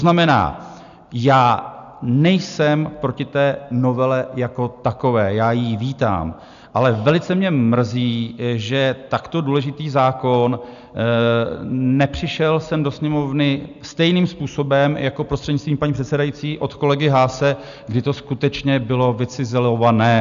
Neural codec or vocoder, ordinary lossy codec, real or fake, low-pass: none; AAC, 96 kbps; real; 7.2 kHz